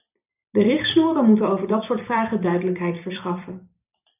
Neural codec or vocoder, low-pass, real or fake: vocoder, 44.1 kHz, 128 mel bands every 256 samples, BigVGAN v2; 3.6 kHz; fake